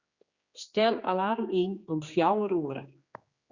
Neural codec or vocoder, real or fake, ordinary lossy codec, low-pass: codec, 16 kHz, 2 kbps, X-Codec, HuBERT features, trained on general audio; fake; Opus, 64 kbps; 7.2 kHz